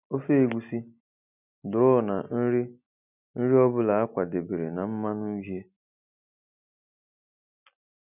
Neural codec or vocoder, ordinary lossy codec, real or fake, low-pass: none; none; real; 3.6 kHz